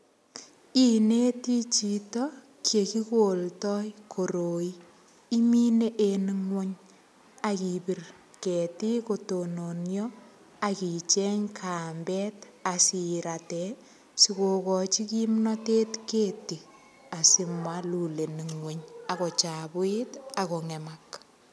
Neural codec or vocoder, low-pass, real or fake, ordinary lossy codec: none; none; real; none